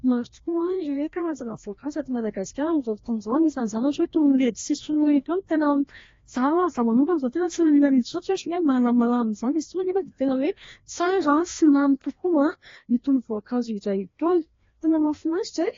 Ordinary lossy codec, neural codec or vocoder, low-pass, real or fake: AAC, 32 kbps; codec, 16 kHz, 1 kbps, FreqCodec, larger model; 7.2 kHz; fake